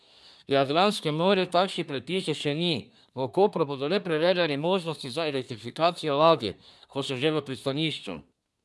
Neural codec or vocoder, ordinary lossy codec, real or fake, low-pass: codec, 24 kHz, 1 kbps, SNAC; none; fake; none